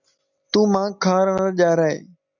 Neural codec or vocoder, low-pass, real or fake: none; 7.2 kHz; real